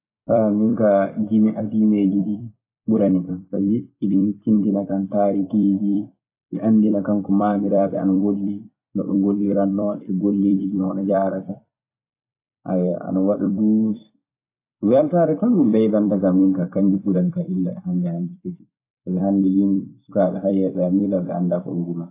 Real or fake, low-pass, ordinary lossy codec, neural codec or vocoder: fake; 3.6 kHz; AAC, 24 kbps; vocoder, 44.1 kHz, 128 mel bands every 256 samples, BigVGAN v2